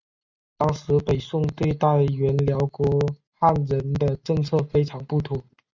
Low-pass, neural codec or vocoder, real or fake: 7.2 kHz; none; real